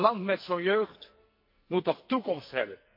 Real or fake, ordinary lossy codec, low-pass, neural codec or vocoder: fake; MP3, 32 kbps; 5.4 kHz; codec, 44.1 kHz, 2.6 kbps, SNAC